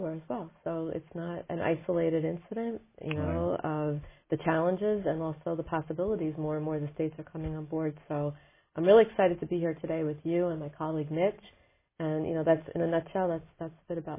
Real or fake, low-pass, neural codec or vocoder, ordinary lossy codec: real; 3.6 kHz; none; MP3, 16 kbps